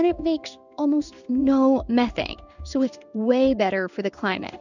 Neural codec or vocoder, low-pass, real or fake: codec, 16 kHz in and 24 kHz out, 1 kbps, XY-Tokenizer; 7.2 kHz; fake